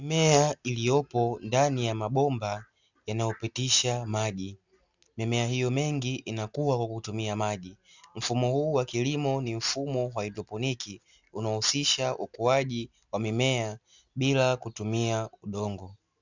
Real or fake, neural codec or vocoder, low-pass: real; none; 7.2 kHz